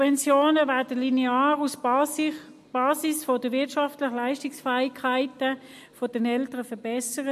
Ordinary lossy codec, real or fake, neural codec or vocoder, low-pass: MP3, 64 kbps; real; none; 14.4 kHz